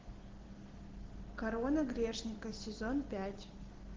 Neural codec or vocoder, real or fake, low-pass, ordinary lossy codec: none; real; 7.2 kHz; Opus, 16 kbps